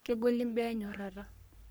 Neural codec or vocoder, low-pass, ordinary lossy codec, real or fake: codec, 44.1 kHz, 3.4 kbps, Pupu-Codec; none; none; fake